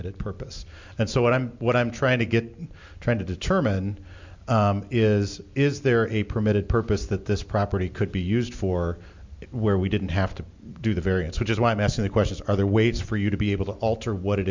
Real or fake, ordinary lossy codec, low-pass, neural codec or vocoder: real; MP3, 64 kbps; 7.2 kHz; none